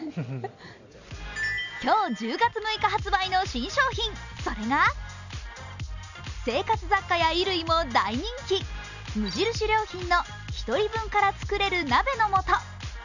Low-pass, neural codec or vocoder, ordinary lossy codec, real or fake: 7.2 kHz; none; none; real